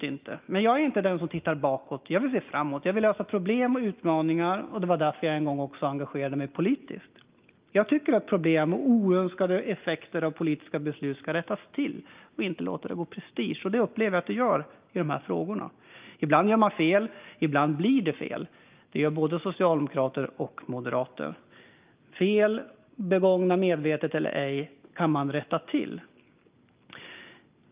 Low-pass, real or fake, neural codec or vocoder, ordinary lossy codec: 3.6 kHz; real; none; Opus, 24 kbps